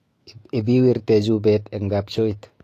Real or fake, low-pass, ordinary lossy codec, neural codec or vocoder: fake; 14.4 kHz; AAC, 48 kbps; autoencoder, 48 kHz, 128 numbers a frame, DAC-VAE, trained on Japanese speech